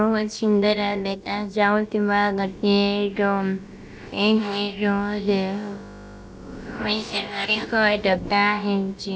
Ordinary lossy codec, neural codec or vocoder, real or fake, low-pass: none; codec, 16 kHz, about 1 kbps, DyCAST, with the encoder's durations; fake; none